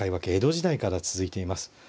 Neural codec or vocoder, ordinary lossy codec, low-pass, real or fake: none; none; none; real